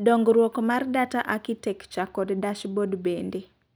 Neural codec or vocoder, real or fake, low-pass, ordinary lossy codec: none; real; none; none